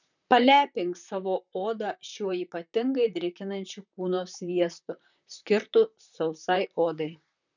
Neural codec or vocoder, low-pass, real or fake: vocoder, 44.1 kHz, 128 mel bands, Pupu-Vocoder; 7.2 kHz; fake